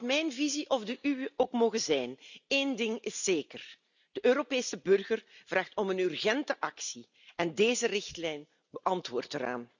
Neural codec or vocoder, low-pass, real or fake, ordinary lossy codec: none; 7.2 kHz; real; none